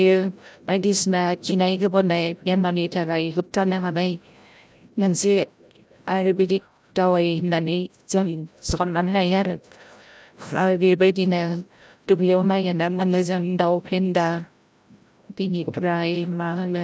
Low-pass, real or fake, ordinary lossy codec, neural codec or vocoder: none; fake; none; codec, 16 kHz, 0.5 kbps, FreqCodec, larger model